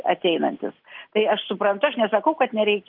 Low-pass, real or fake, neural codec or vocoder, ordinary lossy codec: 5.4 kHz; real; none; Opus, 32 kbps